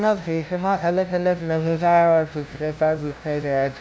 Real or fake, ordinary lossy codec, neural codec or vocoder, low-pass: fake; none; codec, 16 kHz, 0.5 kbps, FunCodec, trained on LibriTTS, 25 frames a second; none